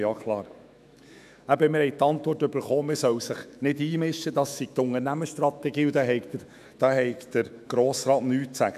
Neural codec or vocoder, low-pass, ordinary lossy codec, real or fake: autoencoder, 48 kHz, 128 numbers a frame, DAC-VAE, trained on Japanese speech; 14.4 kHz; none; fake